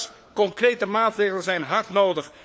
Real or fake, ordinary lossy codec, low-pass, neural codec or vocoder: fake; none; none; codec, 16 kHz, 4 kbps, FunCodec, trained on LibriTTS, 50 frames a second